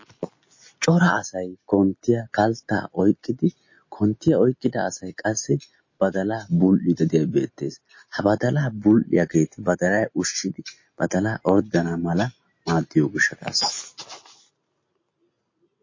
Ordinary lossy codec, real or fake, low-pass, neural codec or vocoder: MP3, 32 kbps; real; 7.2 kHz; none